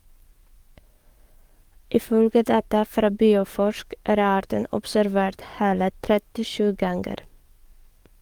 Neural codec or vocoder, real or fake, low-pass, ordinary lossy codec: codec, 44.1 kHz, 7.8 kbps, DAC; fake; 19.8 kHz; Opus, 32 kbps